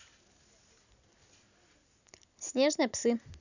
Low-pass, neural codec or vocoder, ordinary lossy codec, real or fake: 7.2 kHz; none; none; real